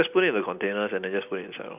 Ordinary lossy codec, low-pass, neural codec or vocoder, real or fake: none; 3.6 kHz; none; real